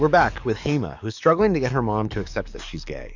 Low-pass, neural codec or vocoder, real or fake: 7.2 kHz; codec, 44.1 kHz, 7.8 kbps, DAC; fake